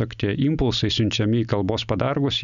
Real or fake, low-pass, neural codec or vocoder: real; 7.2 kHz; none